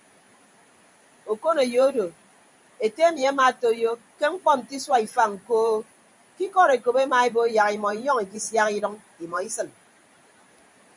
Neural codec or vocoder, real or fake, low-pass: vocoder, 44.1 kHz, 128 mel bands every 512 samples, BigVGAN v2; fake; 10.8 kHz